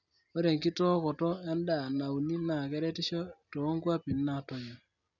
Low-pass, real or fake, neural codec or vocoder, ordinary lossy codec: 7.2 kHz; real; none; none